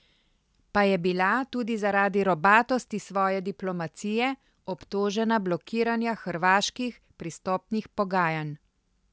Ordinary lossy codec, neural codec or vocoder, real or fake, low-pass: none; none; real; none